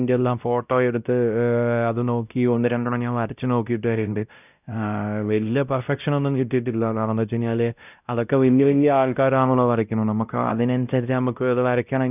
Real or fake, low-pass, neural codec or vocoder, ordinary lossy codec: fake; 3.6 kHz; codec, 16 kHz, 0.5 kbps, X-Codec, WavLM features, trained on Multilingual LibriSpeech; none